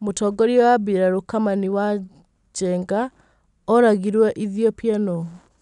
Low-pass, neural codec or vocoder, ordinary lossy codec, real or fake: 10.8 kHz; none; none; real